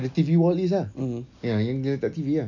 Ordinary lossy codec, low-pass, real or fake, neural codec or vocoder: none; 7.2 kHz; real; none